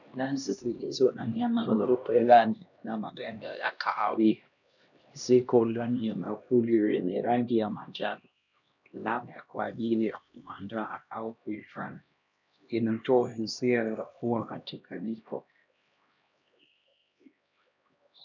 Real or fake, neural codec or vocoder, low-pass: fake; codec, 16 kHz, 1 kbps, X-Codec, HuBERT features, trained on LibriSpeech; 7.2 kHz